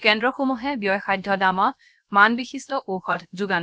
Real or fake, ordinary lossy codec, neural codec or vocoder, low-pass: fake; none; codec, 16 kHz, about 1 kbps, DyCAST, with the encoder's durations; none